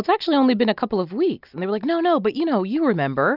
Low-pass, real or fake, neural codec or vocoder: 5.4 kHz; real; none